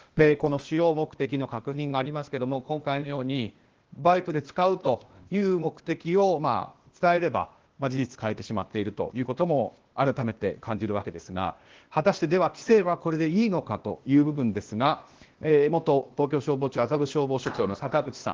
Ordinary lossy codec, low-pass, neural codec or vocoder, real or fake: Opus, 16 kbps; 7.2 kHz; codec, 16 kHz, 0.8 kbps, ZipCodec; fake